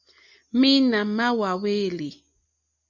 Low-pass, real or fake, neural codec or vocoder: 7.2 kHz; real; none